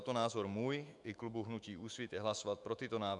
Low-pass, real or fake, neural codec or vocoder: 10.8 kHz; fake; autoencoder, 48 kHz, 128 numbers a frame, DAC-VAE, trained on Japanese speech